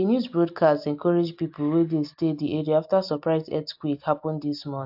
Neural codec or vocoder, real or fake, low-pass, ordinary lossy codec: none; real; 5.4 kHz; AAC, 48 kbps